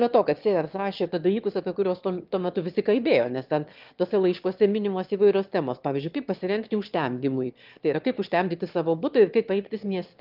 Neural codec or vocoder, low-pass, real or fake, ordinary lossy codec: autoencoder, 22.05 kHz, a latent of 192 numbers a frame, VITS, trained on one speaker; 5.4 kHz; fake; Opus, 32 kbps